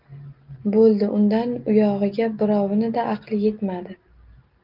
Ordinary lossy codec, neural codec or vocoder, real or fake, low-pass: Opus, 24 kbps; none; real; 5.4 kHz